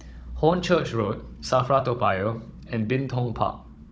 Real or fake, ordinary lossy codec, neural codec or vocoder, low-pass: fake; none; codec, 16 kHz, 16 kbps, FunCodec, trained on Chinese and English, 50 frames a second; none